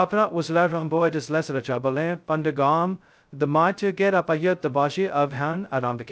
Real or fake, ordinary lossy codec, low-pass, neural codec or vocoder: fake; none; none; codec, 16 kHz, 0.2 kbps, FocalCodec